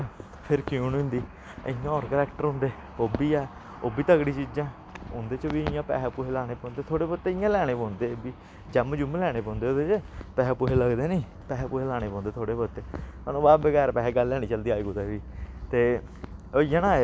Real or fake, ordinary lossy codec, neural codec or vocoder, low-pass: real; none; none; none